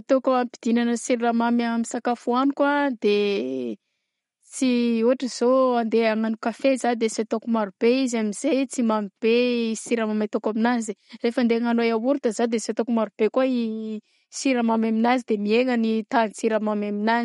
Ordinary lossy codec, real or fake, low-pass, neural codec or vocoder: MP3, 48 kbps; real; 19.8 kHz; none